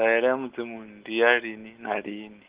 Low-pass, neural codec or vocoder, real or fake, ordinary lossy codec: 3.6 kHz; none; real; Opus, 16 kbps